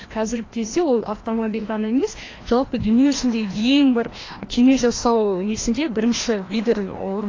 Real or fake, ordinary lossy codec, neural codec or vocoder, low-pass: fake; AAC, 32 kbps; codec, 16 kHz, 1 kbps, FreqCodec, larger model; 7.2 kHz